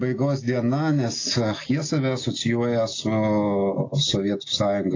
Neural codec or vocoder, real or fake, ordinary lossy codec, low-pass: none; real; AAC, 32 kbps; 7.2 kHz